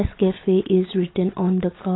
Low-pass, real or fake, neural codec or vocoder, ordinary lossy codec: 7.2 kHz; real; none; AAC, 16 kbps